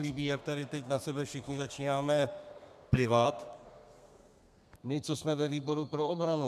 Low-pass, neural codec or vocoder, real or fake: 14.4 kHz; codec, 32 kHz, 1.9 kbps, SNAC; fake